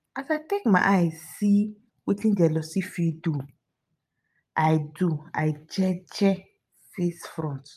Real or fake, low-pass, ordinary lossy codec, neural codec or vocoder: fake; 14.4 kHz; none; vocoder, 44.1 kHz, 128 mel bands every 512 samples, BigVGAN v2